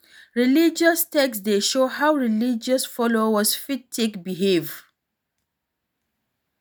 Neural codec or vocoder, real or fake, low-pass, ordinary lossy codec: none; real; none; none